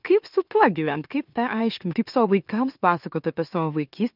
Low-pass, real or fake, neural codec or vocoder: 5.4 kHz; fake; autoencoder, 44.1 kHz, a latent of 192 numbers a frame, MeloTTS